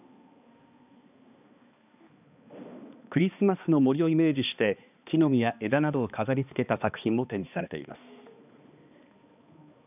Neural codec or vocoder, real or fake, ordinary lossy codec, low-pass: codec, 16 kHz, 2 kbps, X-Codec, HuBERT features, trained on balanced general audio; fake; none; 3.6 kHz